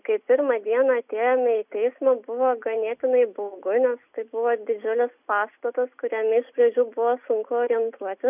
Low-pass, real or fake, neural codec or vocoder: 3.6 kHz; real; none